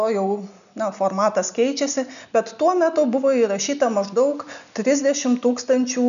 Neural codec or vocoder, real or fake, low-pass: none; real; 7.2 kHz